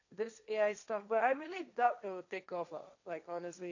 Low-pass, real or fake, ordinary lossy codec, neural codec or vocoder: 7.2 kHz; fake; none; codec, 16 kHz, 1.1 kbps, Voila-Tokenizer